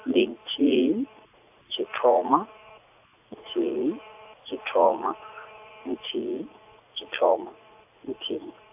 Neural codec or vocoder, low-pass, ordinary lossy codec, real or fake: vocoder, 44.1 kHz, 80 mel bands, Vocos; 3.6 kHz; AAC, 32 kbps; fake